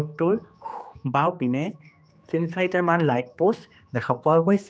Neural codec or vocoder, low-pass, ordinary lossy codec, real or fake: codec, 16 kHz, 2 kbps, X-Codec, HuBERT features, trained on general audio; none; none; fake